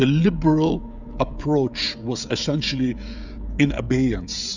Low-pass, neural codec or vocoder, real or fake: 7.2 kHz; codec, 16 kHz, 16 kbps, FreqCodec, smaller model; fake